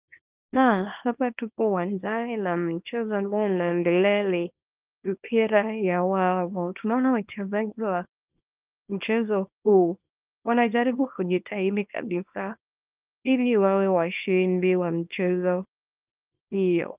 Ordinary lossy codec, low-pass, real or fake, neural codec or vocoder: Opus, 24 kbps; 3.6 kHz; fake; codec, 24 kHz, 0.9 kbps, WavTokenizer, small release